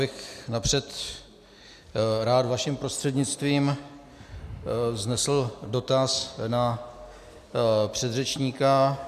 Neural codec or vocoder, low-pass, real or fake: none; 14.4 kHz; real